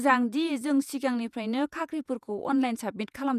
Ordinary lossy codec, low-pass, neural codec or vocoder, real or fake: none; 14.4 kHz; vocoder, 48 kHz, 128 mel bands, Vocos; fake